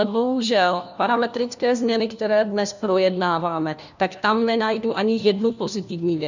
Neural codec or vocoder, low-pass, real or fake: codec, 16 kHz, 1 kbps, FunCodec, trained on LibriTTS, 50 frames a second; 7.2 kHz; fake